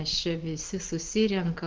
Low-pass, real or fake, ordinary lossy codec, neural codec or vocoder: 7.2 kHz; real; Opus, 16 kbps; none